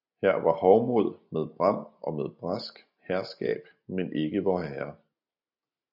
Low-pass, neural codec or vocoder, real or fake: 5.4 kHz; none; real